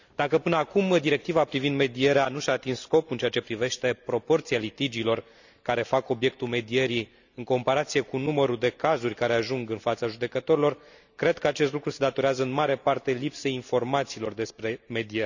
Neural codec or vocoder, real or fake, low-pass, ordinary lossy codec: none; real; 7.2 kHz; none